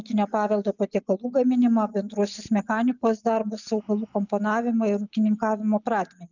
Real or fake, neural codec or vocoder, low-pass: real; none; 7.2 kHz